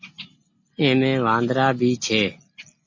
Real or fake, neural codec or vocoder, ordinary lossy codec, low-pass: real; none; MP3, 32 kbps; 7.2 kHz